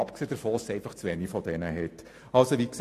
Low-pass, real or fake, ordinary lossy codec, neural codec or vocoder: 14.4 kHz; real; AAC, 64 kbps; none